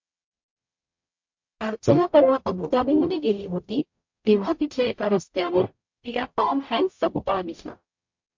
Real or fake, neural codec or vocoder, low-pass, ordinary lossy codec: fake; codec, 44.1 kHz, 0.9 kbps, DAC; 7.2 kHz; MP3, 48 kbps